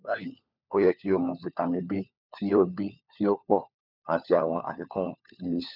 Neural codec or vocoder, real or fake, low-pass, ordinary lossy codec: codec, 16 kHz, 4 kbps, FunCodec, trained on LibriTTS, 50 frames a second; fake; 5.4 kHz; none